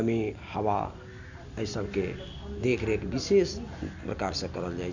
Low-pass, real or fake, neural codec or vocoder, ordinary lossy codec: 7.2 kHz; real; none; none